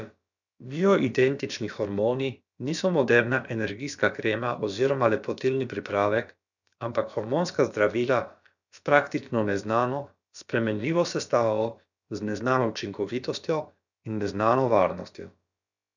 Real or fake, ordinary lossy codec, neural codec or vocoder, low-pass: fake; none; codec, 16 kHz, about 1 kbps, DyCAST, with the encoder's durations; 7.2 kHz